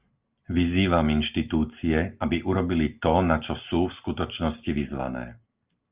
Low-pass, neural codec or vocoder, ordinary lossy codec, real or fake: 3.6 kHz; none; Opus, 24 kbps; real